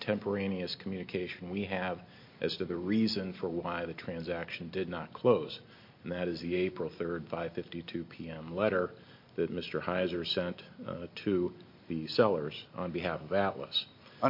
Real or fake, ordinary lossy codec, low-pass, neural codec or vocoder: real; MP3, 32 kbps; 5.4 kHz; none